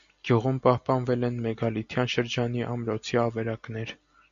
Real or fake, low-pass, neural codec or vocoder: real; 7.2 kHz; none